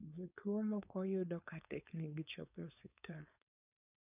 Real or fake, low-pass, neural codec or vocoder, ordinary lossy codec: fake; 3.6 kHz; codec, 16 kHz, 4.8 kbps, FACodec; none